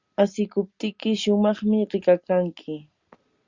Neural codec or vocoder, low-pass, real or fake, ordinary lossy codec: none; 7.2 kHz; real; Opus, 64 kbps